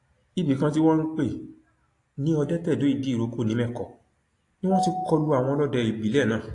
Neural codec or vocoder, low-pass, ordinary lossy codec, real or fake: none; 10.8 kHz; AAC, 48 kbps; real